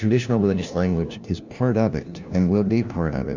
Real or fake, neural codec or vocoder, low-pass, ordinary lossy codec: fake; codec, 16 kHz, 1 kbps, FunCodec, trained on LibriTTS, 50 frames a second; 7.2 kHz; Opus, 64 kbps